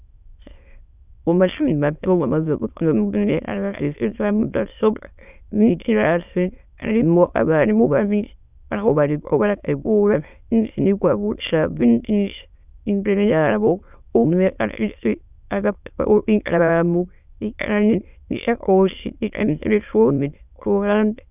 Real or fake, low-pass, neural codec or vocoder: fake; 3.6 kHz; autoencoder, 22.05 kHz, a latent of 192 numbers a frame, VITS, trained on many speakers